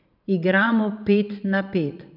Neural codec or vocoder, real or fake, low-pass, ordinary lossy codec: vocoder, 44.1 kHz, 80 mel bands, Vocos; fake; 5.4 kHz; none